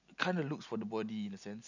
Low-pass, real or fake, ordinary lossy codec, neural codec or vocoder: 7.2 kHz; real; none; none